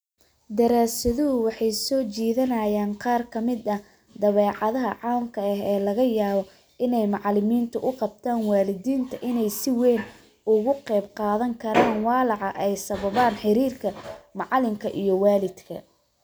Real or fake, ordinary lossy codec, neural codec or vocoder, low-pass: real; none; none; none